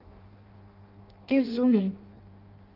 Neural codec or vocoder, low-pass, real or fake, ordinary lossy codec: codec, 16 kHz in and 24 kHz out, 0.6 kbps, FireRedTTS-2 codec; 5.4 kHz; fake; Opus, 24 kbps